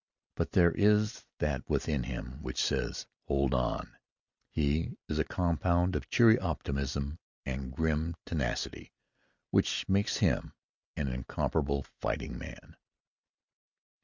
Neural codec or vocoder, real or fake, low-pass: none; real; 7.2 kHz